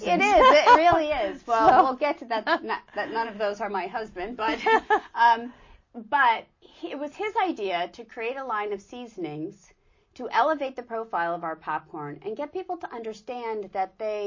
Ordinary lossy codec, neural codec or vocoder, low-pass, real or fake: MP3, 32 kbps; none; 7.2 kHz; real